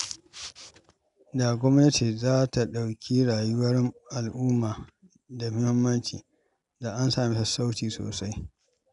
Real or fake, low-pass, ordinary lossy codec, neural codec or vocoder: real; 10.8 kHz; none; none